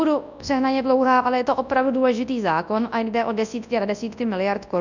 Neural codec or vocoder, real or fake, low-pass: codec, 24 kHz, 0.9 kbps, WavTokenizer, large speech release; fake; 7.2 kHz